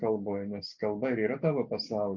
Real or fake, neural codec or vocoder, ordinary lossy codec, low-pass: real; none; MP3, 64 kbps; 7.2 kHz